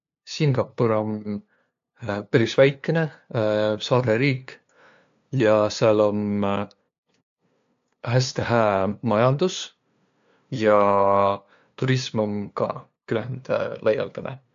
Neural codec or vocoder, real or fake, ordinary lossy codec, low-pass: codec, 16 kHz, 2 kbps, FunCodec, trained on LibriTTS, 25 frames a second; fake; AAC, 64 kbps; 7.2 kHz